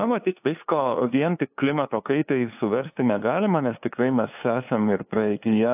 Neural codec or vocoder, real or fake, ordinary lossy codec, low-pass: codec, 16 kHz, 2 kbps, FunCodec, trained on LibriTTS, 25 frames a second; fake; AAC, 32 kbps; 3.6 kHz